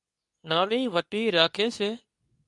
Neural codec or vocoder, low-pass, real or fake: codec, 24 kHz, 0.9 kbps, WavTokenizer, medium speech release version 2; 10.8 kHz; fake